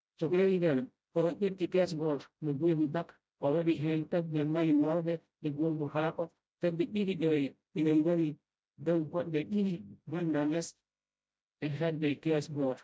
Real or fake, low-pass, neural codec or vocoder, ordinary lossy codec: fake; none; codec, 16 kHz, 0.5 kbps, FreqCodec, smaller model; none